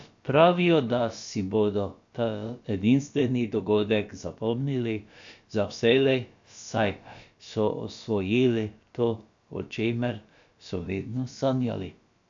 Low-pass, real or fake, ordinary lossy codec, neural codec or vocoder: 7.2 kHz; fake; AAC, 64 kbps; codec, 16 kHz, about 1 kbps, DyCAST, with the encoder's durations